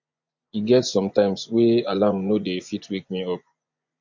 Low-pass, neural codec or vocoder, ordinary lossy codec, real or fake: 7.2 kHz; vocoder, 24 kHz, 100 mel bands, Vocos; MP3, 48 kbps; fake